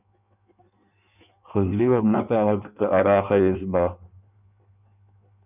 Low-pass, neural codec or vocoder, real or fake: 3.6 kHz; codec, 16 kHz in and 24 kHz out, 1.1 kbps, FireRedTTS-2 codec; fake